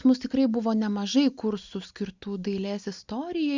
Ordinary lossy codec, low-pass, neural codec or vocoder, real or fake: Opus, 64 kbps; 7.2 kHz; none; real